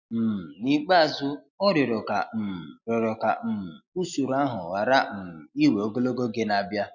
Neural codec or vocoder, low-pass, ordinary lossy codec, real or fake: none; 7.2 kHz; none; real